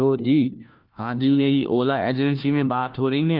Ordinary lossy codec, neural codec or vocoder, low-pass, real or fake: Opus, 24 kbps; codec, 16 kHz, 1 kbps, FunCodec, trained on LibriTTS, 50 frames a second; 5.4 kHz; fake